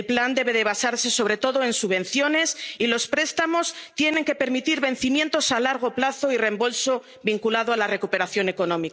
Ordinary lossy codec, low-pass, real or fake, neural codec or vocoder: none; none; real; none